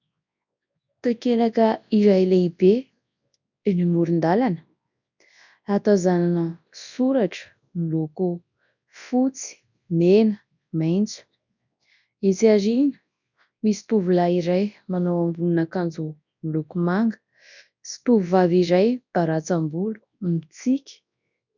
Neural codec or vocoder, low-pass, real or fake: codec, 24 kHz, 0.9 kbps, WavTokenizer, large speech release; 7.2 kHz; fake